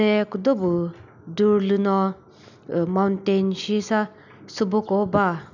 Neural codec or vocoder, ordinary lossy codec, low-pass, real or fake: none; none; 7.2 kHz; real